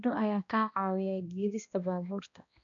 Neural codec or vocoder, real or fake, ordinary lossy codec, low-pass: codec, 16 kHz, 1 kbps, X-Codec, HuBERT features, trained on balanced general audio; fake; none; 7.2 kHz